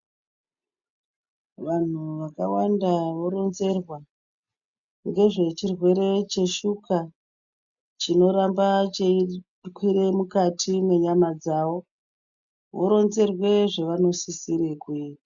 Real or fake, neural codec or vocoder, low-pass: real; none; 7.2 kHz